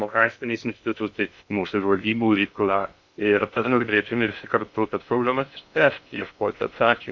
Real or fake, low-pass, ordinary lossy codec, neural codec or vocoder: fake; 7.2 kHz; MP3, 64 kbps; codec, 16 kHz in and 24 kHz out, 0.6 kbps, FocalCodec, streaming, 2048 codes